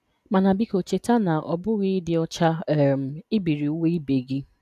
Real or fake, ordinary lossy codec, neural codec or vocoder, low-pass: real; none; none; 14.4 kHz